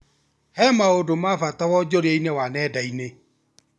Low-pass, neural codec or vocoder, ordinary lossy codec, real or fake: none; none; none; real